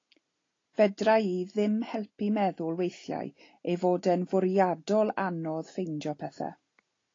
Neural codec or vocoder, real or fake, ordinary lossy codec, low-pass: none; real; AAC, 32 kbps; 7.2 kHz